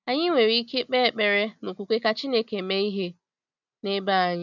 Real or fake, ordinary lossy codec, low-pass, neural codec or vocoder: real; none; 7.2 kHz; none